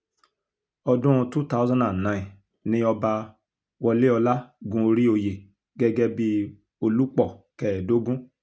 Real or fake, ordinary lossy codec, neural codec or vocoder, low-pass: real; none; none; none